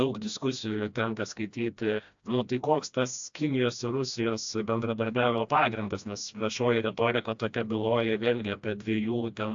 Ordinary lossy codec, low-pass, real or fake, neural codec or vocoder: AAC, 64 kbps; 7.2 kHz; fake; codec, 16 kHz, 1 kbps, FreqCodec, smaller model